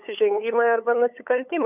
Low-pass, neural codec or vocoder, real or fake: 3.6 kHz; codec, 16 kHz, 8 kbps, FunCodec, trained on LibriTTS, 25 frames a second; fake